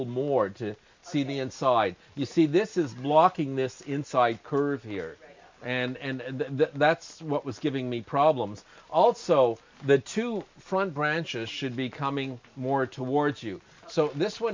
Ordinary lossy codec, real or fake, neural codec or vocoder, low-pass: MP3, 64 kbps; real; none; 7.2 kHz